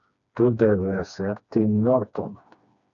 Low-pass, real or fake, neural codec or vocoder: 7.2 kHz; fake; codec, 16 kHz, 2 kbps, FreqCodec, smaller model